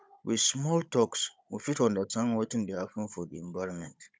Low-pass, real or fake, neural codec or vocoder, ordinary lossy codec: none; fake; codec, 16 kHz, 16 kbps, FunCodec, trained on Chinese and English, 50 frames a second; none